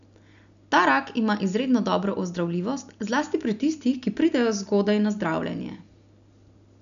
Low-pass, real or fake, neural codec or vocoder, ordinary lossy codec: 7.2 kHz; real; none; none